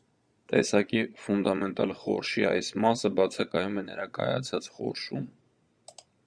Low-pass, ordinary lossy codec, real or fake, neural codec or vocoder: 9.9 kHz; Opus, 64 kbps; fake; vocoder, 22.05 kHz, 80 mel bands, Vocos